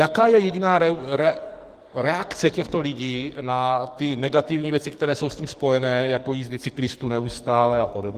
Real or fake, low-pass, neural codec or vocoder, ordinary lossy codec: fake; 14.4 kHz; codec, 44.1 kHz, 2.6 kbps, SNAC; Opus, 24 kbps